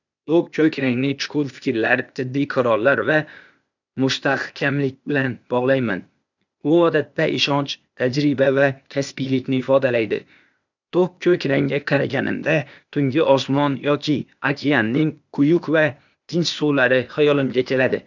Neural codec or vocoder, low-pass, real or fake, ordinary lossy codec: codec, 16 kHz, 0.8 kbps, ZipCodec; 7.2 kHz; fake; none